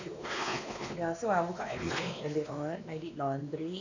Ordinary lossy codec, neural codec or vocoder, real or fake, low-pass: none; codec, 16 kHz, 2 kbps, X-Codec, WavLM features, trained on Multilingual LibriSpeech; fake; 7.2 kHz